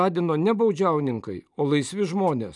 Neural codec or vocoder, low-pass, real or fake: none; 10.8 kHz; real